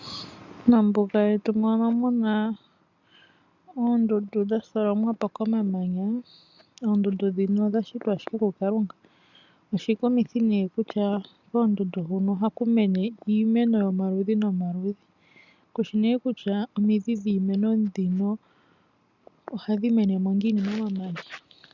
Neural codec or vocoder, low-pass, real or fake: none; 7.2 kHz; real